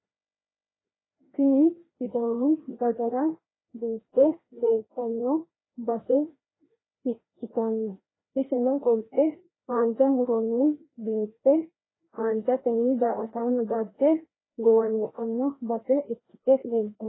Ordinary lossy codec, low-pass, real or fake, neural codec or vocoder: AAC, 16 kbps; 7.2 kHz; fake; codec, 16 kHz, 1 kbps, FreqCodec, larger model